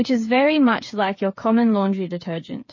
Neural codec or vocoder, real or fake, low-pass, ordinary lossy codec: codec, 16 kHz, 8 kbps, FreqCodec, smaller model; fake; 7.2 kHz; MP3, 32 kbps